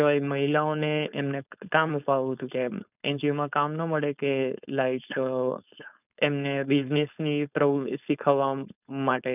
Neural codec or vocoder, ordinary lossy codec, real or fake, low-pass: codec, 16 kHz, 4.8 kbps, FACodec; none; fake; 3.6 kHz